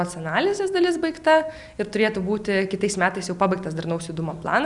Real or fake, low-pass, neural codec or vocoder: real; 10.8 kHz; none